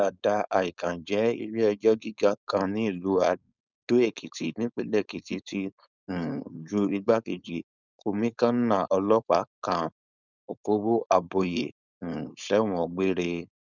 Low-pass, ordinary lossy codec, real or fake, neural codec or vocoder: 7.2 kHz; none; fake; codec, 16 kHz, 4.8 kbps, FACodec